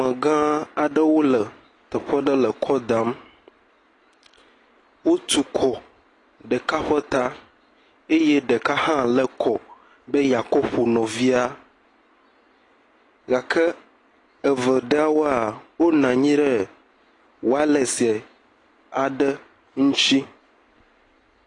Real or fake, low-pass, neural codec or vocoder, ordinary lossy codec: real; 10.8 kHz; none; AAC, 32 kbps